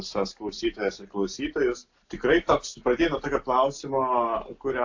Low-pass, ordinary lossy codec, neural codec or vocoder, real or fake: 7.2 kHz; AAC, 48 kbps; none; real